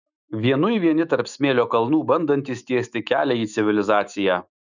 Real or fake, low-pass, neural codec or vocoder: fake; 7.2 kHz; autoencoder, 48 kHz, 128 numbers a frame, DAC-VAE, trained on Japanese speech